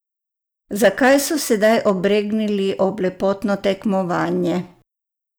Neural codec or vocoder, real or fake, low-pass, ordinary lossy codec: none; real; none; none